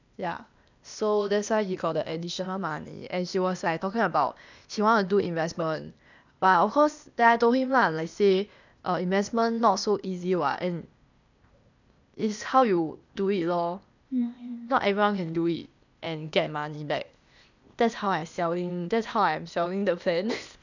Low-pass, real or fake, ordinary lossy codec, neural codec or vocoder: 7.2 kHz; fake; none; codec, 16 kHz, 0.8 kbps, ZipCodec